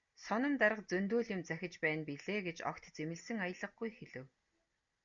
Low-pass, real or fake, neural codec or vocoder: 7.2 kHz; real; none